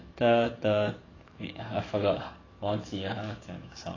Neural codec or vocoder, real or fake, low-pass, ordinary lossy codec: codec, 44.1 kHz, 7.8 kbps, DAC; fake; 7.2 kHz; AAC, 32 kbps